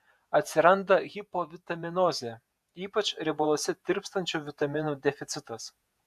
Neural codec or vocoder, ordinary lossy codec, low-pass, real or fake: vocoder, 48 kHz, 128 mel bands, Vocos; AAC, 96 kbps; 14.4 kHz; fake